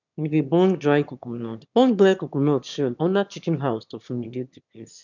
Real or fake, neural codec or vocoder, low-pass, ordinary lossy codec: fake; autoencoder, 22.05 kHz, a latent of 192 numbers a frame, VITS, trained on one speaker; 7.2 kHz; none